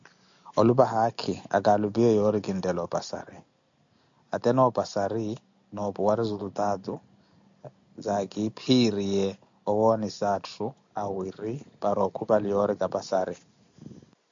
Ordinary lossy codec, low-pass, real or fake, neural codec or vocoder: AAC, 64 kbps; 7.2 kHz; real; none